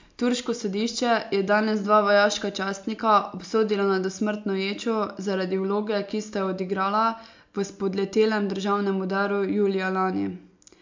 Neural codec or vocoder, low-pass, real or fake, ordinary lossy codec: none; 7.2 kHz; real; MP3, 64 kbps